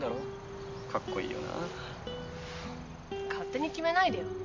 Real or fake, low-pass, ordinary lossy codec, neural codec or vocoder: real; 7.2 kHz; MP3, 48 kbps; none